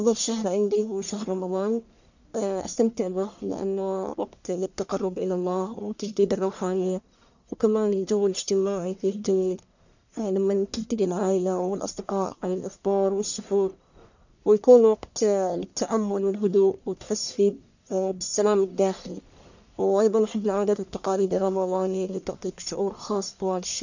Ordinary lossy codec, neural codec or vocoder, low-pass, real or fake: none; codec, 44.1 kHz, 1.7 kbps, Pupu-Codec; 7.2 kHz; fake